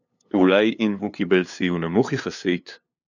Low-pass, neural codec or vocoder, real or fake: 7.2 kHz; codec, 16 kHz, 2 kbps, FunCodec, trained on LibriTTS, 25 frames a second; fake